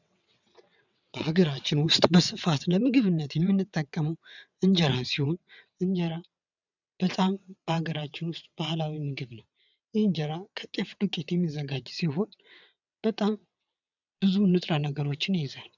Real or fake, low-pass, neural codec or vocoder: fake; 7.2 kHz; vocoder, 22.05 kHz, 80 mel bands, WaveNeXt